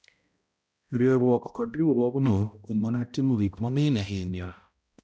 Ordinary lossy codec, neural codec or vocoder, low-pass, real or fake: none; codec, 16 kHz, 0.5 kbps, X-Codec, HuBERT features, trained on balanced general audio; none; fake